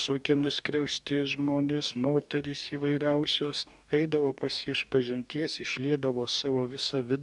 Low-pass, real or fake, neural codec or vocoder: 10.8 kHz; fake; codec, 44.1 kHz, 2.6 kbps, DAC